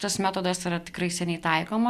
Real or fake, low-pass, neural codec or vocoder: real; 14.4 kHz; none